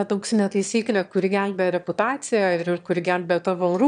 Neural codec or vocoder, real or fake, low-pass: autoencoder, 22.05 kHz, a latent of 192 numbers a frame, VITS, trained on one speaker; fake; 9.9 kHz